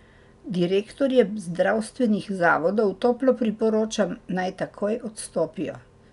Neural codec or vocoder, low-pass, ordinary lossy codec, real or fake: none; 10.8 kHz; none; real